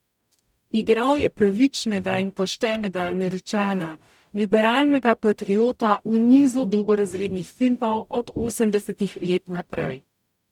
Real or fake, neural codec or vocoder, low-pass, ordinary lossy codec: fake; codec, 44.1 kHz, 0.9 kbps, DAC; 19.8 kHz; none